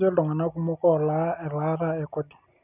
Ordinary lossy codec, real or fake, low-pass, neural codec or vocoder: none; real; 3.6 kHz; none